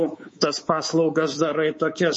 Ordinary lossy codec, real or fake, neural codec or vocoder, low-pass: MP3, 32 kbps; fake; codec, 24 kHz, 3.1 kbps, DualCodec; 10.8 kHz